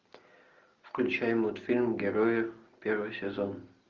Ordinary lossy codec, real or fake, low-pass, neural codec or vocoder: Opus, 32 kbps; real; 7.2 kHz; none